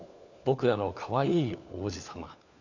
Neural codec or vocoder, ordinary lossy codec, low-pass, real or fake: codec, 16 kHz, 4 kbps, FunCodec, trained on LibriTTS, 50 frames a second; none; 7.2 kHz; fake